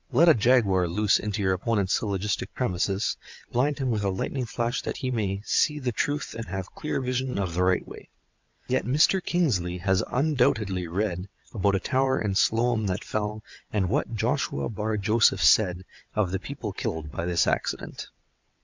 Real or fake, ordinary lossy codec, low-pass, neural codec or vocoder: fake; MP3, 64 kbps; 7.2 kHz; vocoder, 22.05 kHz, 80 mel bands, WaveNeXt